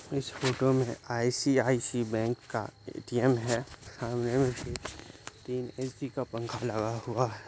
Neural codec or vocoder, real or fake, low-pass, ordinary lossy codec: none; real; none; none